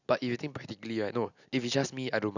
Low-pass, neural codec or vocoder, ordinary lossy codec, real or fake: 7.2 kHz; none; none; real